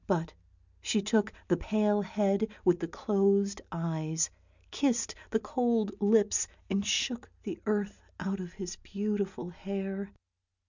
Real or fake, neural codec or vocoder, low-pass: real; none; 7.2 kHz